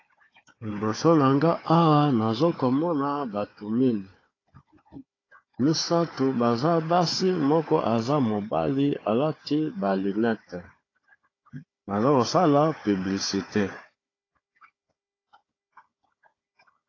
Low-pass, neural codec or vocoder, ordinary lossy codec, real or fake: 7.2 kHz; codec, 16 kHz, 4 kbps, FunCodec, trained on Chinese and English, 50 frames a second; AAC, 32 kbps; fake